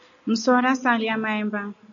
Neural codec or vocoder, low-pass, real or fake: none; 7.2 kHz; real